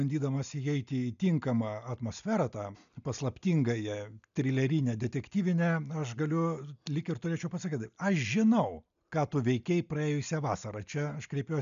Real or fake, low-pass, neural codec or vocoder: real; 7.2 kHz; none